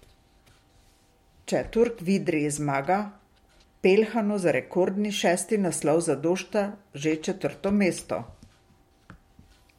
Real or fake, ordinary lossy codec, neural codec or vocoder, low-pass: real; MP3, 64 kbps; none; 19.8 kHz